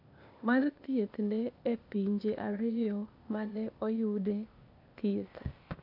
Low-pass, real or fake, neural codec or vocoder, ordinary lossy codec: 5.4 kHz; fake; codec, 16 kHz, 0.8 kbps, ZipCodec; none